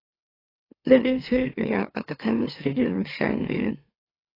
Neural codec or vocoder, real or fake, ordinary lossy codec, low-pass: autoencoder, 44.1 kHz, a latent of 192 numbers a frame, MeloTTS; fake; AAC, 24 kbps; 5.4 kHz